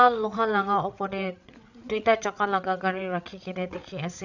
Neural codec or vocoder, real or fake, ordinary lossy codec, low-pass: codec, 16 kHz, 8 kbps, FreqCodec, larger model; fake; none; 7.2 kHz